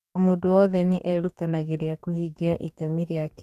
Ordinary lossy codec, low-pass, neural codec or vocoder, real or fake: none; 14.4 kHz; codec, 44.1 kHz, 2.6 kbps, DAC; fake